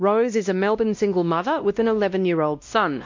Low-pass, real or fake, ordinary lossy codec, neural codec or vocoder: 7.2 kHz; fake; MP3, 48 kbps; codec, 16 kHz, 1 kbps, X-Codec, WavLM features, trained on Multilingual LibriSpeech